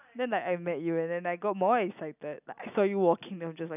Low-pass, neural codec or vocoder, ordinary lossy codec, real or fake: 3.6 kHz; none; none; real